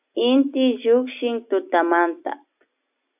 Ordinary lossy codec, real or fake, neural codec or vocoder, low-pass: AAC, 32 kbps; real; none; 3.6 kHz